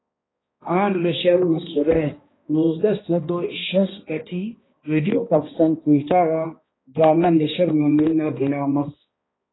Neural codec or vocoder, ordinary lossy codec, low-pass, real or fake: codec, 16 kHz, 1 kbps, X-Codec, HuBERT features, trained on balanced general audio; AAC, 16 kbps; 7.2 kHz; fake